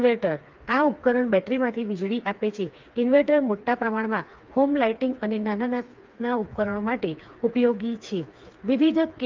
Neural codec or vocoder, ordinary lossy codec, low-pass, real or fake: codec, 16 kHz, 4 kbps, FreqCodec, smaller model; Opus, 24 kbps; 7.2 kHz; fake